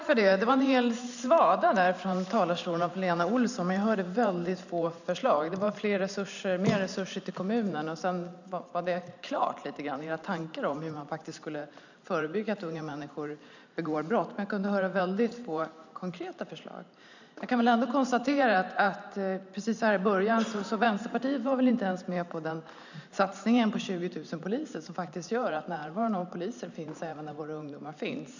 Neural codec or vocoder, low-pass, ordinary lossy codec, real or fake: vocoder, 44.1 kHz, 128 mel bands every 512 samples, BigVGAN v2; 7.2 kHz; none; fake